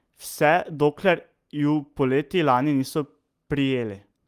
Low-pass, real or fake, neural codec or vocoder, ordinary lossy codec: 14.4 kHz; real; none; Opus, 32 kbps